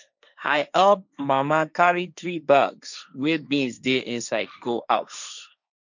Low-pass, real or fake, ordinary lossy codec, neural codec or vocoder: 7.2 kHz; fake; none; codec, 16 kHz, 1.1 kbps, Voila-Tokenizer